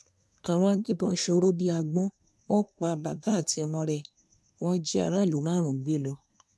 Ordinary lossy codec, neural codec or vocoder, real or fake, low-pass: none; codec, 24 kHz, 1 kbps, SNAC; fake; none